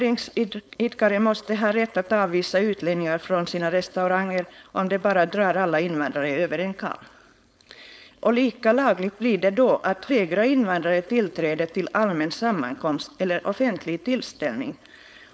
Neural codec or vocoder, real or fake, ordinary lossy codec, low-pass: codec, 16 kHz, 4.8 kbps, FACodec; fake; none; none